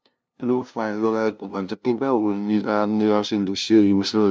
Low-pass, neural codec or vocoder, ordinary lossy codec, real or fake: none; codec, 16 kHz, 0.5 kbps, FunCodec, trained on LibriTTS, 25 frames a second; none; fake